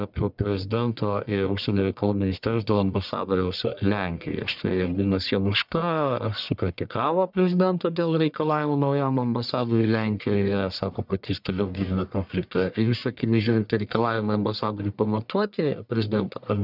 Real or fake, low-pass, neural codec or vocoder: fake; 5.4 kHz; codec, 44.1 kHz, 1.7 kbps, Pupu-Codec